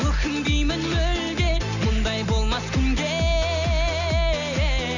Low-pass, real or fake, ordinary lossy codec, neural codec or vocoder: 7.2 kHz; real; none; none